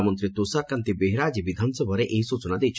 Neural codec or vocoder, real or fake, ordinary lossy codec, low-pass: none; real; none; none